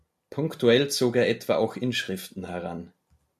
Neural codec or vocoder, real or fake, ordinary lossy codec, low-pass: none; real; MP3, 96 kbps; 14.4 kHz